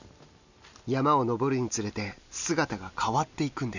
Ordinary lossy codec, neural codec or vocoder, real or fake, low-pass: none; none; real; 7.2 kHz